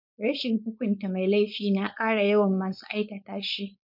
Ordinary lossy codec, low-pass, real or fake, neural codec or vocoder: none; 5.4 kHz; fake; codec, 16 kHz, 4.8 kbps, FACodec